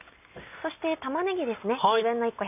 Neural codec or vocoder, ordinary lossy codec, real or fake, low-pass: none; none; real; 3.6 kHz